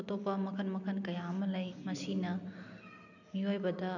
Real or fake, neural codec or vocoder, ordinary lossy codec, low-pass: real; none; none; 7.2 kHz